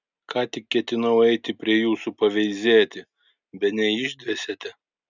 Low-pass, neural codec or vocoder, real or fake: 7.2 kHz; none; real